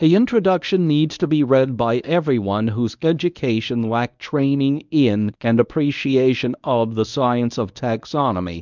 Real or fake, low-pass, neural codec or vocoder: fake; 7.2 kHz; codec, 24 kHz, 0.9 kbps, WavTokenizer, medium speech release version 1